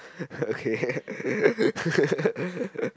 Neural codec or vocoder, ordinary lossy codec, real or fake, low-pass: none; none; real; none